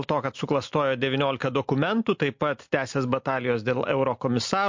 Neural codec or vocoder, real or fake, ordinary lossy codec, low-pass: none; real; MP3, 48 kbps; 7.2 kHz